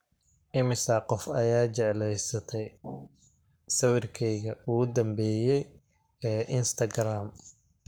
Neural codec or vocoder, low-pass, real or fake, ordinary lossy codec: codec, 44.1 kHz, 7.8 kbps, Pupu-Codec; none; fake; none